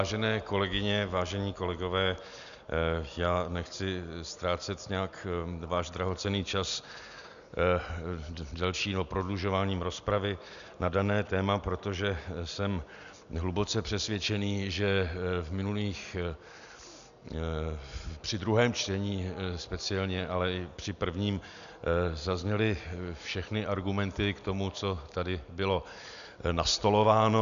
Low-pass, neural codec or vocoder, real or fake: 7.2 kHz; none; real